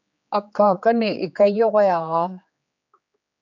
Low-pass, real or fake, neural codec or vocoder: 7.2 kHz; fake; codec, 16 kHz, 4 kbps, X-Codec, HuBERT features, trained on general audio